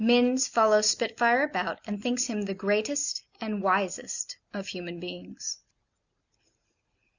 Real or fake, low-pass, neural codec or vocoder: real; 7.2 kHz; none